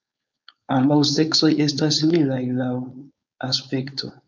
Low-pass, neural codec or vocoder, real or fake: 7.2 kHz; codec, 16 kHz, 4.8 kbps, FACodec; fake